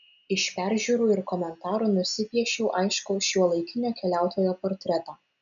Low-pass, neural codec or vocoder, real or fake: 7.2 kHz; none; real